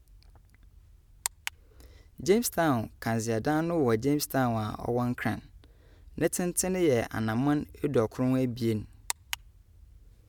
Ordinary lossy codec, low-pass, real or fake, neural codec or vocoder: none; 19.8 kHz; real; none